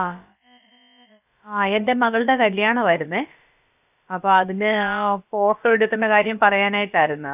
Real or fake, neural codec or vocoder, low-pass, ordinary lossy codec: fake; codec, 16 kHz, about 1 kbps, DyCAST, with the encoder's durations; 3.6 kHz; none